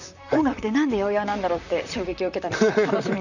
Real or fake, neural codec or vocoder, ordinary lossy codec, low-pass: fake; vocoder, 44.1 kHz, 128 mel bands, Pupu-Vocoder; none; 7.2 kHz